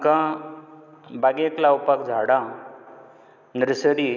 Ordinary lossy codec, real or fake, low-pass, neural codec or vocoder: none; real; 7.2 kHz; none